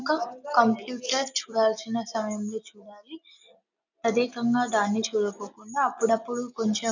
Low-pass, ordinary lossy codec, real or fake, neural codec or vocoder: 7.2 kHz; none; real; none